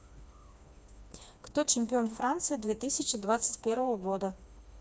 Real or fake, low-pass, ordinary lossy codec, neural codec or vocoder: fake; none; none; codec, 16 kHz, 2 kbps, FreqCodec, smaller model